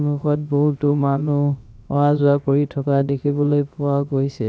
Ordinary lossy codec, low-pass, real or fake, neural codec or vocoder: none; none; fake; codec, 16 kHz, about 1 kbps, DyCAST, with the encoder's durations